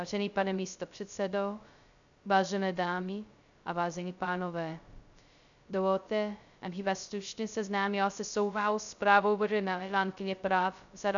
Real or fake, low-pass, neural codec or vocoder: fake; 7.2 kHz; codec, 16 kHz, 0.2 kbps, FocalCodec